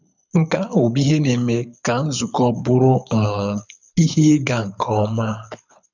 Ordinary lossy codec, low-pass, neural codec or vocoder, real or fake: none; 7.2 kHz; codec, 24 kHz, 6 kbps, HILCodec; fake